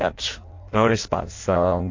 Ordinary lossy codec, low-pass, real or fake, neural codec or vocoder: AAC, 48 kbps; 7.2 kHz; fake; codec, 16 kHz in and 24 kHz out, 0.6 kbps, FireRedTTS-2 codec